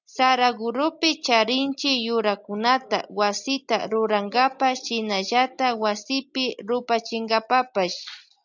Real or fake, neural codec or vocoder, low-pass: real; none; 7.2 kHz